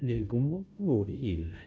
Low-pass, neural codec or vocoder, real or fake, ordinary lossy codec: none; codec, 16 kHz, 0.5 kbps, FunCodec, trained on Chinese and English, 25 frames a second; fake; none